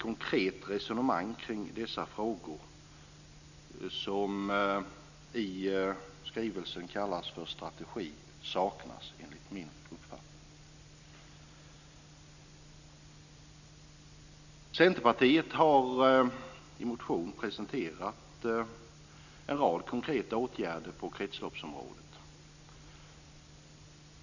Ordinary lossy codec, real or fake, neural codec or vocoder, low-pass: none; real; none; 7.2 kHz